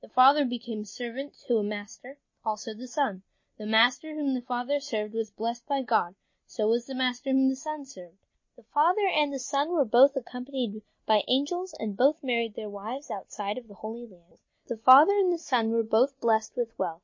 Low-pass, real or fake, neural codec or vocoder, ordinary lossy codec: 7.2 kHz; real; none; MP3, 32 kbps